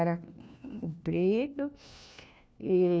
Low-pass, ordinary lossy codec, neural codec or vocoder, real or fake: none; none; codec, 16 kHz, 1 kbps, FunCodec, trained on LibriTTS, 50 frames a second; fake